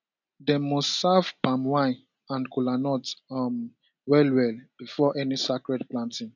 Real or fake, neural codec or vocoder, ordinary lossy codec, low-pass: real; none; none; 7.2 kHz